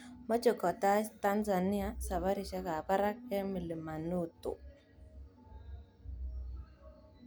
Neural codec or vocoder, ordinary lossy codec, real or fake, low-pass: none; none; real; none